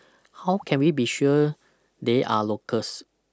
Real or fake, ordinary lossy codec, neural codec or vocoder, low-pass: real; none; none; none